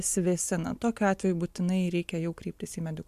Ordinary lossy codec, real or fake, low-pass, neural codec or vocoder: AAC, 96 kbps; real; 14.4 kHz; none